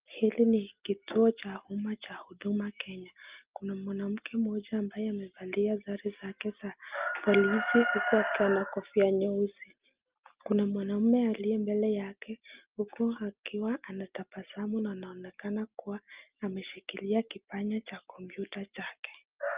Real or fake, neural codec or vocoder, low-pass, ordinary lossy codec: real; none; 3.6 kHz; Opus, 32 kbps